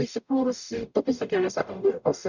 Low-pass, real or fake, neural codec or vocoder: 7.2 kHz; fake; codec, 44.1 kHz, 0.9 kbps, DAC